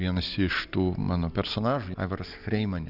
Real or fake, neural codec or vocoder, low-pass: fake; vocoder, 44.1 kHz, 128 mel bands every 256 samples, BigVGAN v2; 5.4 kHz